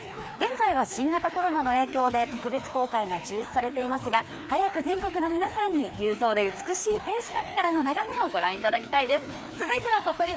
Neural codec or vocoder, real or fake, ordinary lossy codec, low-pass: codec, 16 kHz, 2 kbps, FreqCodec, larger model; fake; none; none